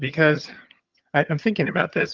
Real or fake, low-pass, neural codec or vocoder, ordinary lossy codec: fake; 7.2 kHz; vocoder, 22.05 kHz, 80 mel bands, HiFi-GAN; Opus, 32 kbps